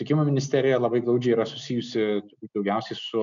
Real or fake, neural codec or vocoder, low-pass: real; none; 7.2 kHz